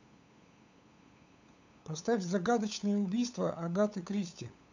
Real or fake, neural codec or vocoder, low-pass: fake; codec, 16 kHz, 8 kbps, FunCodec, trained on LibriTTS, 25 frames a second; 7.2 kHz